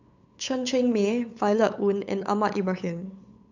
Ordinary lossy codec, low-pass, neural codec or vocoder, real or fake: none; 7.2 kHz; codec, 16 kHz, 8 kbps, FunCodec, trained on LibriTTS, 25 frames a second; fake